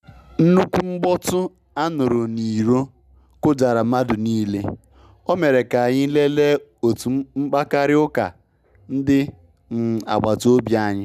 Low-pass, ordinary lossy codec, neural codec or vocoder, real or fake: 14.4 kHz; none; none; real